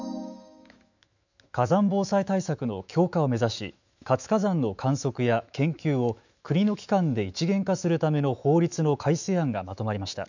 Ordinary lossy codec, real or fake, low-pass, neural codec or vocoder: none; fake; 7.2 kHz; autoencoder, 48 kHz, 128 numbers a frame, DAC-VAE, trained on Japanese speech